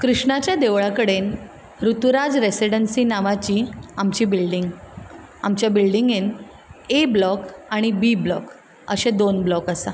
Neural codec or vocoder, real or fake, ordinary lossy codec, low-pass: none; real; none; none